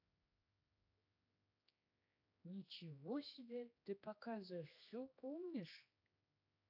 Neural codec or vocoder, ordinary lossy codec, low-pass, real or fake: codec, 16 kHz, 2 kbps, X-Codec, HuBERT features, trained on general audio; AAC, 48 kbps; 5.4 kHz; fake